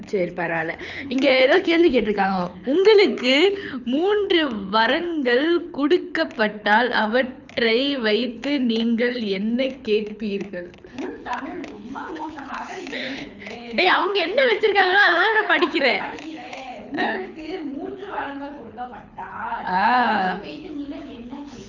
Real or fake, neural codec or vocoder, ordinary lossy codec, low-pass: fake; codec, 24 kHz, 6 kbps, HILCodec; none; 7.2 kHz